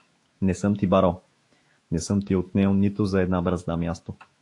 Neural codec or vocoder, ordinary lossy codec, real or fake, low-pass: autoencoder, 48 kHz, 128 numbers a frame, DAC-VAE, trained on Japanese speech; AAC, 48 kbps; fake; 10.8 kHz